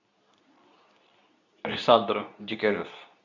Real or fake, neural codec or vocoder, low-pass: fake; codec, 24 kHz, 0.9 kbps, WavTokenizer, medium speech release version 2; 7.2 kHz